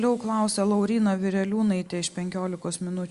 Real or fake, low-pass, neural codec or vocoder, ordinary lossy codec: real; 10.8 kHz; none; Opus, 64 kbps